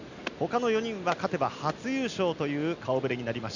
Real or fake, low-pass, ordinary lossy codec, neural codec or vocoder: real; 7.2 kHz; none; none